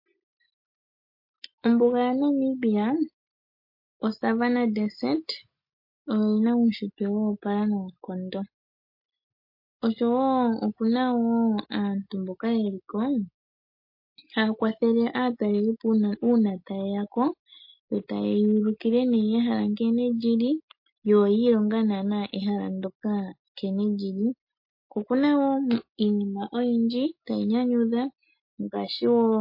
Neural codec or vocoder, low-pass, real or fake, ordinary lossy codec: none; 5.4 kHz; real; MP3, 32 kbps